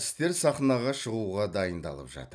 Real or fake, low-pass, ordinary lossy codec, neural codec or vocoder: real; none; none; none